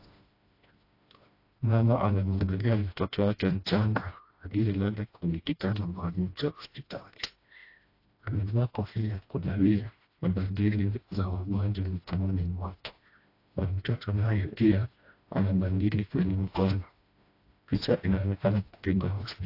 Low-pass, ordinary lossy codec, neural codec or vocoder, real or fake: 5.4 kHz; AAC, 32 kbps; codec, 16 kHz, 1 kbps, FreqCodec, smaller model; fake